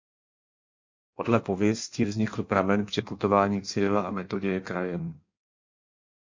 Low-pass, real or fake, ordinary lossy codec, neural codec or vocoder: 7.2 kHz; fake; MP3, 48 kbps; codec, 16 kHz in and 24 kHz out, 1.1 kbps, FireRedTTS-2 codec